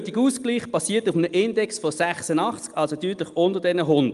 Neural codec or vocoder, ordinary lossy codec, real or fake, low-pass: none; Opus, 32 kbps; real; 10.8 kHz